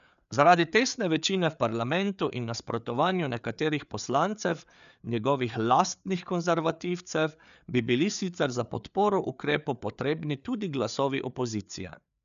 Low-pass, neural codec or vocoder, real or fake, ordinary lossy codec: 7.2 kHz; codec, 16 kHz, 4 kbps, FreqCodec, larger model; fake; none